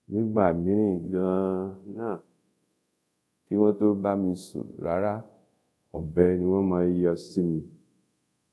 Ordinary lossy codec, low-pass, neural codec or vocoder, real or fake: none; none; codec, 24 kHz, 0.5 kbps, DualCodec; fake